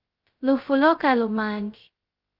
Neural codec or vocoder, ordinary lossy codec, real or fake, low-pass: codec, 16 kHz, 0.2 kbps, FocalCodec; Opus, 32 kbps; fake; 5.4 kHz